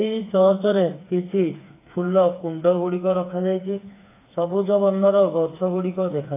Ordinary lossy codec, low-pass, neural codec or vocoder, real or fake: AAC, 24 kbps; 3.6 kHz; codec, 16 kHz, 4 kbps, FreqCodec, smaller model; fake